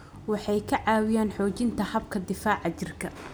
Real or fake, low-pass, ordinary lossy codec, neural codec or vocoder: real; none; none; none